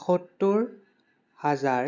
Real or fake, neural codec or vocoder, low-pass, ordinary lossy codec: real; none; 7.2 kHz; none